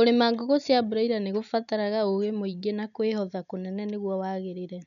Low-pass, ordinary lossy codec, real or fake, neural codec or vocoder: 7.2 kHz; none; real; none